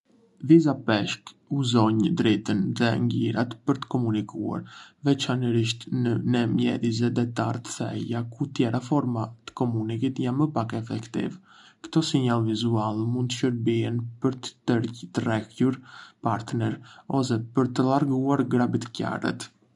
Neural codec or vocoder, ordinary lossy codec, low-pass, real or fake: none; none; 10.8 kHz; real